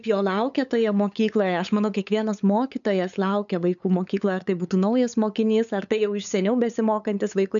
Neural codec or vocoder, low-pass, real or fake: codec, 16 kHz, 8 kbps, FunCodec, trained on LibriTTS, 25 frames a second; 7.2 kHz; fake